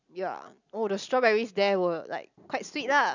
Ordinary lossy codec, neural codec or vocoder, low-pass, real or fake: none; none; 7.2 kHz; real